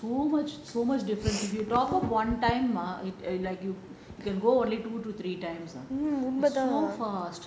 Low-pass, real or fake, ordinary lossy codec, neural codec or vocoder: none; real; none; none